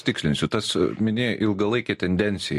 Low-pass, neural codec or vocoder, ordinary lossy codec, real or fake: 14.4 kHz; none; MP3, 64 kbps; real